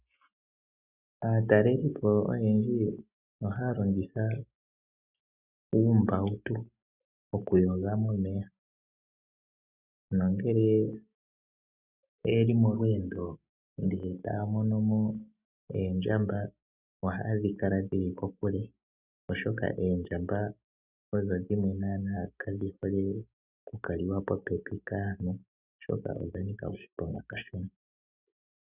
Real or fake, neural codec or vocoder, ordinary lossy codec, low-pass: real; none; Opus, 64 kbps; 3.6 kHz